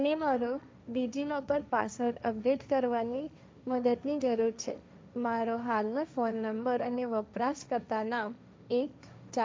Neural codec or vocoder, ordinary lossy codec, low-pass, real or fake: codec, 16 kHz, 1.1 kbps, Voila-Tokenizer; none; none; fake